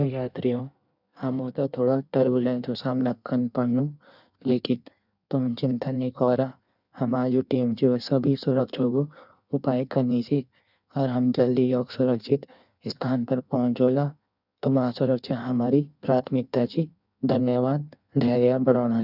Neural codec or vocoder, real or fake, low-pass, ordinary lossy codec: codec, 16 kHz in and 24 kHz out, 1.1 kbps, FireRedTTS-2 codec; fake; 5.4 kHz; none